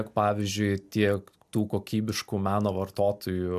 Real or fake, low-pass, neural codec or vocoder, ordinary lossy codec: real; 14.4 kHz; none; AAC, 96 kbps